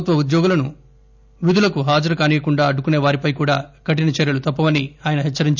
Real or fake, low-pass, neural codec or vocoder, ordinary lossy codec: real; 7.2 kHz; none; none